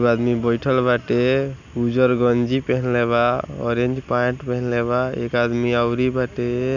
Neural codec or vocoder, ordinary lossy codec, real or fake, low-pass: none; none; real; 7.2 kHz